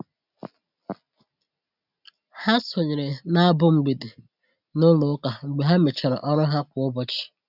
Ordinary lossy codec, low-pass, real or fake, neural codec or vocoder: none; 5.4 kHz; real; none